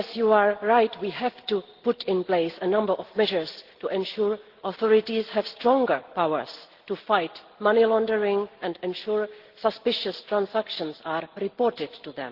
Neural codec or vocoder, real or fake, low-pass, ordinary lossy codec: none; real; 5.4 kHz; Opus, 16 kbps